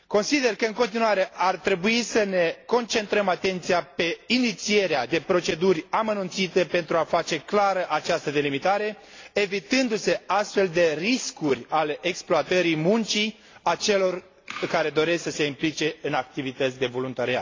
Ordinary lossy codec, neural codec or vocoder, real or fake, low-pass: AAC, 32 kbps; none; real; 7.2 kHz